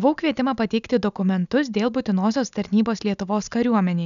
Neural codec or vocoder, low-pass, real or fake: none; 7.2 kHz; real